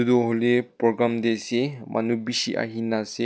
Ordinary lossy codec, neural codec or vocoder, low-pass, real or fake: none; none; none; real